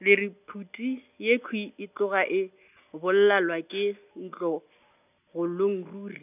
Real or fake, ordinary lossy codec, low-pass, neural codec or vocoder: real; none; 3.6 kHz; none